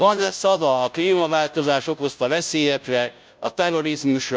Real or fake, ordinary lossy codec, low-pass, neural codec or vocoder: fake; none; none; codec, 16 kHz, 0.5 kbps, FunCodec, trained on Chinese and English, 25 frames a second